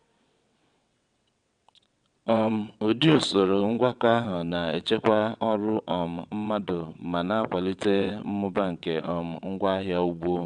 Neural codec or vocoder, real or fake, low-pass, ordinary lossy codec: vocoder, 22.05 kHz, 80 mel bands, WaveNeXt; fake; 9.9 kHz; none